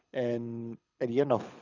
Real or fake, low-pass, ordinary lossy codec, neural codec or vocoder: fake; 7.2 kHz; none; codec, 24 kHz, 6 kbps, HILCodec